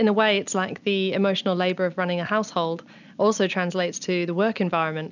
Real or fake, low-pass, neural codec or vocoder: real; 7.2 kHz; none